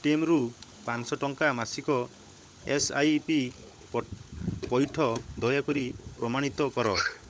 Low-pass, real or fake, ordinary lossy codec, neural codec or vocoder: none; fake; none; codec, 16 kHz, 16 kbps, FunCodec, trained on LibriTTS, 50 frames a second